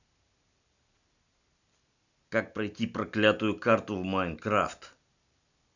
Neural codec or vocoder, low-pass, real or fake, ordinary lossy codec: none; 7.2 kHz; real; none